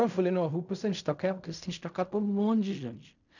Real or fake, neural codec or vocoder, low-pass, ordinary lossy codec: fake; codec, 16 kHz in and 24 kHz out, 0.4 kbps, LongCat-Audio-Codec, fine tuned four codebook decoder; 7.2 kHz; none